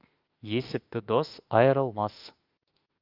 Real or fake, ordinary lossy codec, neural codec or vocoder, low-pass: fake; Opus, 24 kbps; autoencoder, 48 kHz, 32 numbers a frame, DAC-VAE, trained on Japanese speech; 5.4 kHz